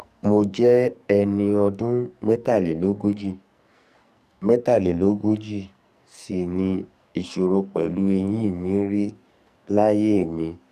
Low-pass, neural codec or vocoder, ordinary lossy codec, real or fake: 14.4 kHz; codec, 44.1 kHz, 2.6 kbps, SNAC; none; fake